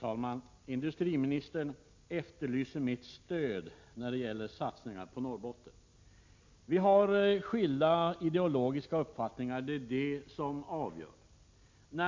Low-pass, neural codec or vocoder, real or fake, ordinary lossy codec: 7.2 kHz; none; real; MP3, 48 kbps